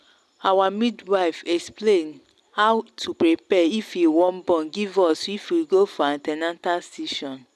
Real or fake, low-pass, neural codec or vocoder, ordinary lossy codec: real; none; none; none